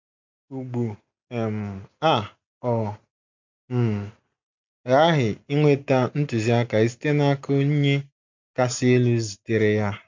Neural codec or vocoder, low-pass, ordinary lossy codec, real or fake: none; 7.2 kHz; MP3, 64 kbps; real